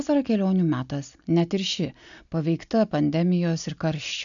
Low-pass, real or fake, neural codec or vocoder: 7.2 kHz; real; none